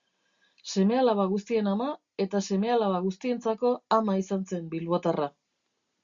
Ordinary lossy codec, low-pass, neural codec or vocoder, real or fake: Opus, 64 kbps; 7.2 kHz; none; real